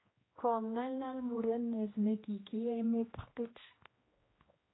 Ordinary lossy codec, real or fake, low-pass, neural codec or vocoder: AAC, 16 kbps; fake; 7.2 kHz; codec, 16 kHz, 1 kbps, X-Codec, HuBERT features, trained on general audio